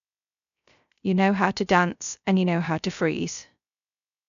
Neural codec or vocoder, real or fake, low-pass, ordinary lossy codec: codec, 16 kHz, 0.3 kbps, FocalCodec; fake; 7.2 kHz; none